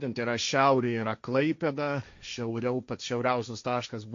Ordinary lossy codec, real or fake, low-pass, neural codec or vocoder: MP3, 48 kbps; fake; 7.2 kHz; codec, 16 kHz, 1.1 kbps, Voila-Tokenizer